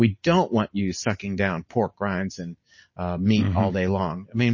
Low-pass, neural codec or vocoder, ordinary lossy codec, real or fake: 7.2 kHz; none; MP3, 32 kbps; real